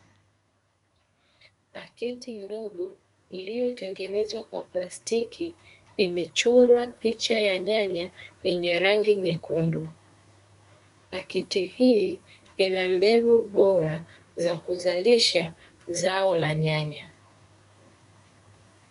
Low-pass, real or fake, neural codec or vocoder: 10.8 kHz; fake; codec, 24 kHz, 1 kbps, SNAC